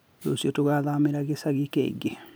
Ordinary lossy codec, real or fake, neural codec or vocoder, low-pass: none; real; none; none